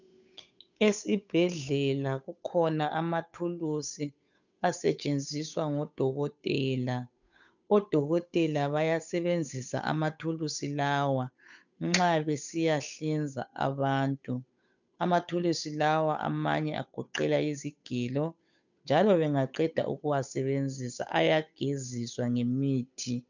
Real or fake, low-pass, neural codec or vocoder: fake; 7.2 kHz; codec, 16 kHz, 8 kbps, FunCodec, trained on Chinese and English, 25 frames a second